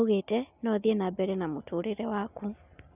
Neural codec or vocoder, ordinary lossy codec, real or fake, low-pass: none; none; real; 3.6 kHz